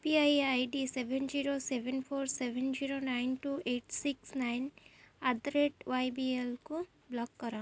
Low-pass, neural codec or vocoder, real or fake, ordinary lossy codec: none; none; real; none